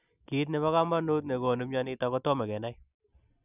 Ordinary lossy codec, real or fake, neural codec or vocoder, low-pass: none; real; none; 3.6 kHz